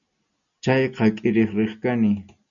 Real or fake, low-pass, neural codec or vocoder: real; 7.2 kHz; none